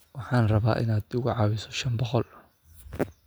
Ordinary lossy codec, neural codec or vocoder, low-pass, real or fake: none; none; none; real